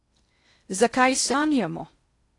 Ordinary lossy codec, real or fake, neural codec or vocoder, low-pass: AAC, 48 kbps; fake; codec, 16 kHz in and 24 kHz out, 0.6 kbps, FocalCodec, streaming, 2048 codes; 10.8 kHz